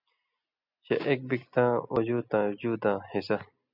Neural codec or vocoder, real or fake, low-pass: none; real; 5.4 kHz